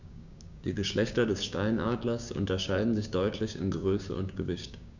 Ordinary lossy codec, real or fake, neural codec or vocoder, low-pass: none; fake; codec, 16 kHz, 6 kbps, DAC; 7.2 kHz